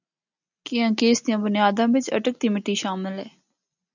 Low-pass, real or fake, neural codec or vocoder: 7.2 kHz; real; none